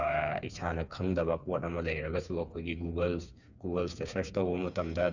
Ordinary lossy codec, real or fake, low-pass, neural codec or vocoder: none; fake; 7.2 kHz; codec, 16 kHz, 4 kbps, FreqCodec, smaller model